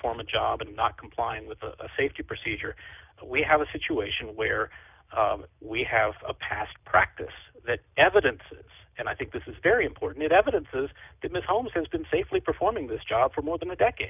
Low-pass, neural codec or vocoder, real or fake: 3.6 kHz; none; real